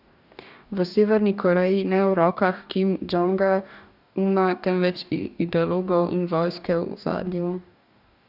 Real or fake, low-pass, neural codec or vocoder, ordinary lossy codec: fake; 5.4 kHz; codec, 44.1 kHz, 2.6 kbps, DAC; none